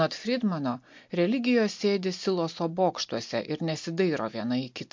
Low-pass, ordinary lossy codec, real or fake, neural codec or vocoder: 7.2 kHz; MP3, 48 kbps; real; none